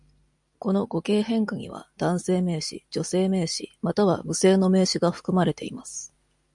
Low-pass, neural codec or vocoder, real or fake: 10.8 kHz; none; real